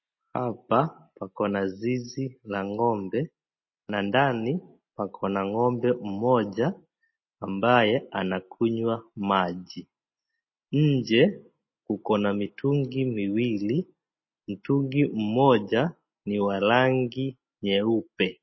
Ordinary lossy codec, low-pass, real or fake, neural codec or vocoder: MP3, 24 kbps; 7.2 kHz; real; none